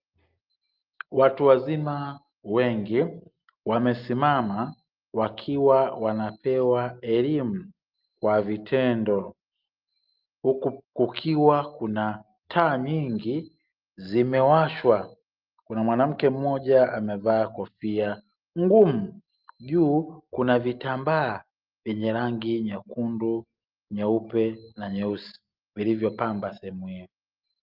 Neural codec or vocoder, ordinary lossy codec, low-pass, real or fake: none; Opus, 32 kbps; 5.4 kHz; real